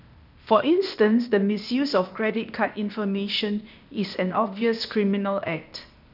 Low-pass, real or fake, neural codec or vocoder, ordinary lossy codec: 5.4 kHz; fake; codec, 16 kHz, 0.8 kbps, ZipCodec; none